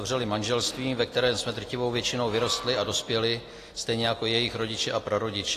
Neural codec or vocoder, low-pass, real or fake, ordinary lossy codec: none; 14.4 kHz; real; AAC, 48 kbps